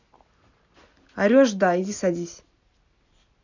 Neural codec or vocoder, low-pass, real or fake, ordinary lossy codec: none; 7.2 kHz; real; none